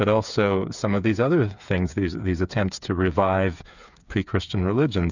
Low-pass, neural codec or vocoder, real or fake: 7.2 kHz; codec, 16 kHz, 8 kbps, FreqCodec, smaller model; fake